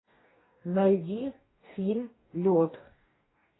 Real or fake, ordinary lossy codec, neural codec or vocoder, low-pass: fake; AAC, 16 kbps; codec, 44.1 kHz, 2.6 kbps, DAC; 7.2 kHz